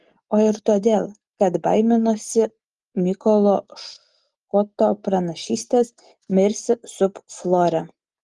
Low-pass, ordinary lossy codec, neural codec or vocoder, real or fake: 10.8 kHz; Opus, 24 kbps; none; real